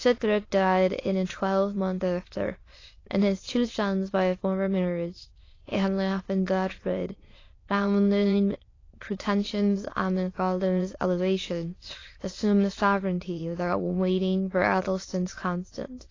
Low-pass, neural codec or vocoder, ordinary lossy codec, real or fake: 7.2 kHz; autoencoder, 22.05 kHz, a latent of 192 numbers a frame, VITS, trained on many speakers; AAC, 32 kbps; fake